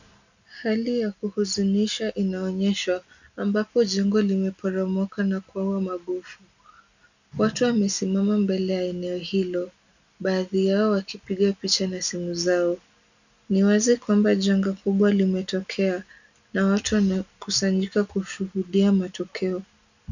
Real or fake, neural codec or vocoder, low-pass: real; none; 7.2 kHz